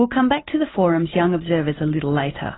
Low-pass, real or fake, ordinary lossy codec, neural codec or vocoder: 7.2 kHz; fake; AAC, 16 kbps; vocoder, 44.1 kHz, 128 mel bands every 256 samples, BigVGAN v2